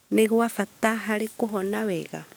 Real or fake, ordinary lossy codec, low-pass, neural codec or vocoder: fake; none; none; codec, 44.1 kHz, 7.8 kbps, DAC